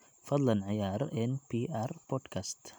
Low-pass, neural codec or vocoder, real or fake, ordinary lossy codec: none; none; real; none